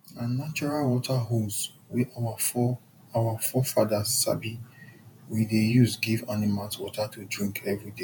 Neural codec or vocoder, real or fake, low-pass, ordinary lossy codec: vocoder, 48 kHz, 128 mel bands, Vocos; fake; 19.8 kHz; none